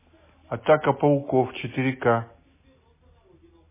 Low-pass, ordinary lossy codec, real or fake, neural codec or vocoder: 3.6 kHz; MP3, 16 kbps; real; none